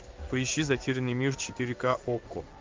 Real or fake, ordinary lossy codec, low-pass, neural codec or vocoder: fake; Opus, 32 kbps; 7.2 kHz; codec, 16 kHz in and 24 kHz out, 1 kbps, XY-Tokenizer